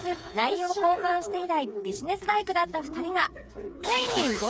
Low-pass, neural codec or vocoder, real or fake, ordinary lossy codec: none; codec, 16 kHz, 4 kbps, FreqCodec, smaller model; fake; none